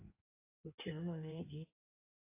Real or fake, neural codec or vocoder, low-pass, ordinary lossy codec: fake; codec, 16 kHz in and 24 kHz out, 1.1 kbps, FireRedTTS-2 codec; 3.6 kHz; AAC, 24 kbps